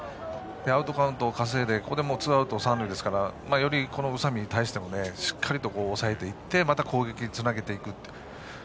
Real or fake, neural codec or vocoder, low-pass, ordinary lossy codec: real; none; none; none